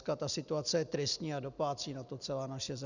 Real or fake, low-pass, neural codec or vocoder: real; 7.2 kHz; none